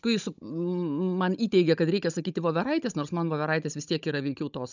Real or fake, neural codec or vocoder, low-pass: fake; codec, 16 kHz, 16 kbps, FunCodec, trained on Chinese and English, 50 frames a second; 7.2 kHz